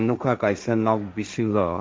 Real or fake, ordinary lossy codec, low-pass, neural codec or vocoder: fake; none; none; codec, 16 kHz, 1.1 kbps, Voila-Tokenizer